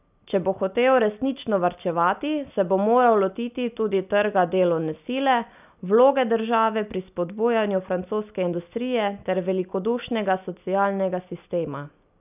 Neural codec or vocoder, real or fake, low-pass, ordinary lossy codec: none; real; 3.6 kHz; none